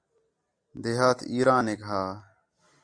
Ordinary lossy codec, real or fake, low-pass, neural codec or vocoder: AAC, 64 kbps; real; 9.9 kHz; none